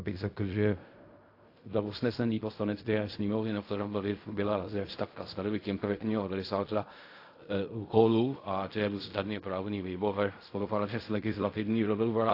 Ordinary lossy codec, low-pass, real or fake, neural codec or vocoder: AAC, 32 kbps; 5.4 kHz; fake; codec, 16 kHz in and 24 kHz out, 0.4 kbps, LongCat-Audio-Codec, fine tuned four codebook decoder